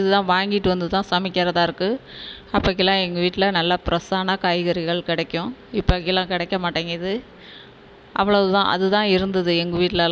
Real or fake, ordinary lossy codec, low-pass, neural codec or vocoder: real; none; none; none